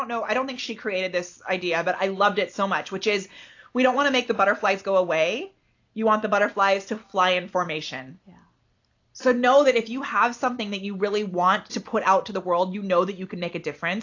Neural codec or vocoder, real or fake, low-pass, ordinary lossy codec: none; real; 7.2 kHz; AAC, 48 kbps